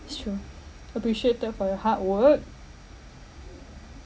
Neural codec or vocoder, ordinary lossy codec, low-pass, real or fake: none; none; none; real